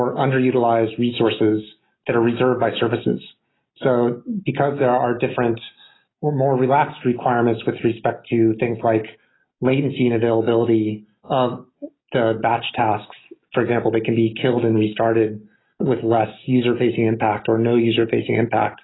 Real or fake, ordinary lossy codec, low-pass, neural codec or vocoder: real; AAC, 16 kbps; 7.2 kHz; none